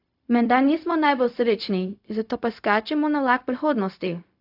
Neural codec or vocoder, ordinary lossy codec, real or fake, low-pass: codec, 16 kHz, 0.4 kbps, LongCat-Audio-Codec; AAC, 48 kbps; fake; 5.4 kHz